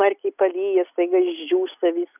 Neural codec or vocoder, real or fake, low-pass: none; real; 3.6 kHz